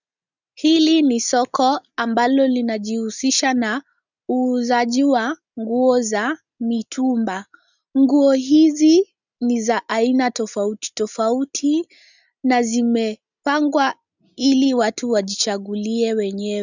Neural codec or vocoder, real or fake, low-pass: none; real; 7.2 kHz